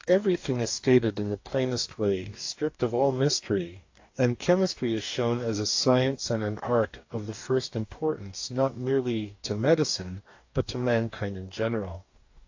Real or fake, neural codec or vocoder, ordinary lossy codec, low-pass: fake; codec, 44.1 kHz, 2.6 kbps, DAC; AAC, 48 kbps; 7.2 kHz